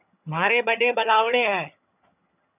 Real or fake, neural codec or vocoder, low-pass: fake; vocoder, 22.05 kHz, 80 mel bands, HiFi-GAN; 3.6 kHz